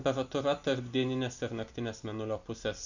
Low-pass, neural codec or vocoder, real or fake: 7.2 kHz; none; real